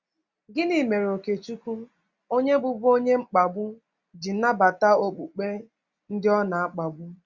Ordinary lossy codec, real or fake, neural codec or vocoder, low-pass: Opus, 64 kbps; real; none; 7.2 kHz